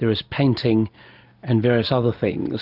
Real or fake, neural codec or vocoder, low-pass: real; none; 5.4 kHz